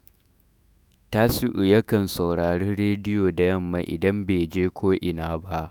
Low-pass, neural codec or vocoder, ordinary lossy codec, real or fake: none; autoencoder, 48 kHz, 128 numbers a frame, DAC-VAE, trained on Japanese speech; none; fake